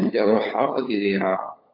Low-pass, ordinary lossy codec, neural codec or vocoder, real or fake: 5.4 kHz; AAC, 32 kbps; codec, 16 kHz, 16 kbps, FunCodec, trained on LibriTTS, 50 frames a second; fake